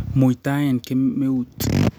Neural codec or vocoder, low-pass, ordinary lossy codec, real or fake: none; none; none; real